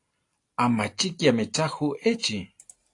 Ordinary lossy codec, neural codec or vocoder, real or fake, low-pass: AAC, 48 kbps; none; real; 10.8 kHz